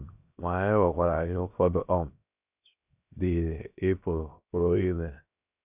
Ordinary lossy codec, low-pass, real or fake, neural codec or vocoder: none; 3.6 kHz; fake; codec, 16 kHz, 0.7 kbps, FocalCodec